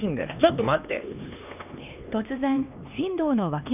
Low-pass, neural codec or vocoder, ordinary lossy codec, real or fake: 3.6 kHz; codec, 16 kHz, 2 kbps, X-Codec, HuBERT features, trained on LibriSpeech; none; fake